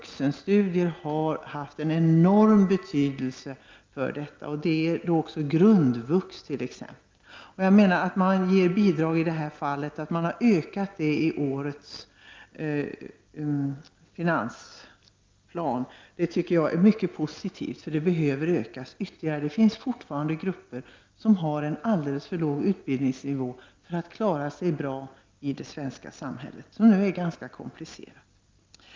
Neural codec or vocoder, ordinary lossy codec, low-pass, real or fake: none; Opus, 32 kbps; 7.2 kHz; real